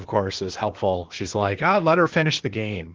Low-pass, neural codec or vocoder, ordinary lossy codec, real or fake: 7.2 kHz; codec, 16 kHz, 0.8 kbps, ZipCodec; Opus, 16 kbps; fake